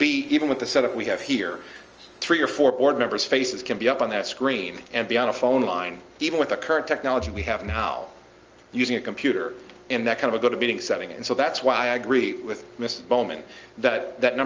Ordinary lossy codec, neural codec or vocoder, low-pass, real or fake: Opus, 24 kbps; none; 7.2 kHz; real